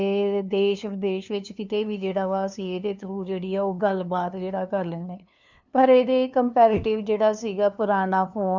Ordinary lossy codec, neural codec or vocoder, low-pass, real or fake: none; codec, 16 kHz, 2 kbps, FunCodec, trained on LibriTTS, 25 frames a second; 7.2 kHz; fake